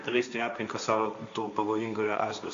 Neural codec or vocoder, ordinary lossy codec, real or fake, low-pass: codec, 16 kHz, 1.1 kbps, Voila-Tokenizer; MP3, 64 kbps; fake; 7.2 kHz